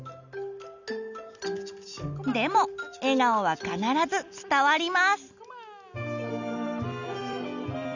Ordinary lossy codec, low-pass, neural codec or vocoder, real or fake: none; 7.2 kHz; none; real